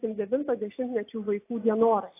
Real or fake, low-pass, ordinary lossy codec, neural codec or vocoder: real; 3.6 kHz; AAC, 24 kbps; none